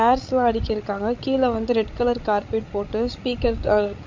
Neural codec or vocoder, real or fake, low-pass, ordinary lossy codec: codec, 16 kHz, 16 kbps, FreqCodec, larger model; fake; 7.2 kHz; MP3, 48 kbps